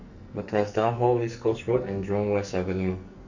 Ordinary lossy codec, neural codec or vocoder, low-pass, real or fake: Opus, 64 kbps; codec, 44.1 kHz, 2.6 kbps, SNAC; 7.2 kHz; fake